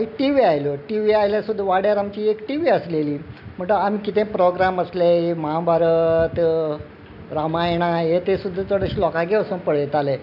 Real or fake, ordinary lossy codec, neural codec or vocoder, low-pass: real; none; none; 5.4 kHz